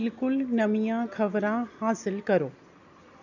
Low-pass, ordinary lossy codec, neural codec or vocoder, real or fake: 7.2 kHz; none; none; real